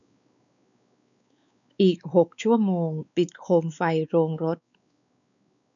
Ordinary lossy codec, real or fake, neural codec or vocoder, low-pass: none; fake; codec, 16 kHz, 4 kbps, X-Codec, WavLM features, trained on Multilingual LibriSpeech; 7.2 kHz